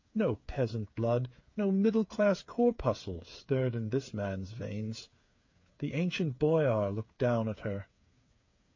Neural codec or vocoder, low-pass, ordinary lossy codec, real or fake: codec, 16 kHz, 8 kbps, FreqCodec, smaller model; 7.2 kHz; MP3, 48 kbps; fake